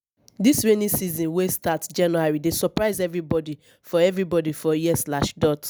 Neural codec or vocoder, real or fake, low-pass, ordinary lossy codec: none; real; none; none